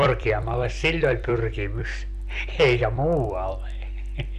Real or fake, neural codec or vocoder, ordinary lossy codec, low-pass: fake; vocoder, 44.1 kHz, 128 mel bands every 256 samples, BigVGAN v2; none; 14.4 kHz